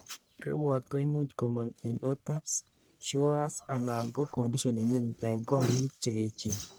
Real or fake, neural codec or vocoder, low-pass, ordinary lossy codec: fake; codec, 44.1 kHz, 1.7 kbps, Pupu-Codec; none; none